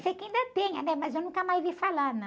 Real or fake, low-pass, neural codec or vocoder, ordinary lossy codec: real; none; none; none